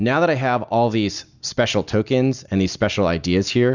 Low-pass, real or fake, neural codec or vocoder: 7.2 kHz; real; none